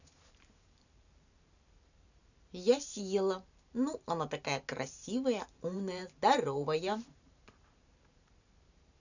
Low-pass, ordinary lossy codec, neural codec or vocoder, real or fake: 7.2 kHz; none; vocoder, 44.1 kHz, 128 mel bands every 512 samples, BigVGAN v2; fake